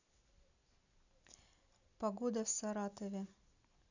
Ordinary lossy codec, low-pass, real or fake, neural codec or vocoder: none; 7.2 kHz; real; none